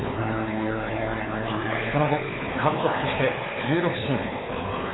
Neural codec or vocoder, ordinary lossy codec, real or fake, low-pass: codec, 16 kHz, 4 kbps, X-Codec, WavLM features, trained on Multilingual LibriSpeech; AAC, 16 kbps; fake; 7.2 kHz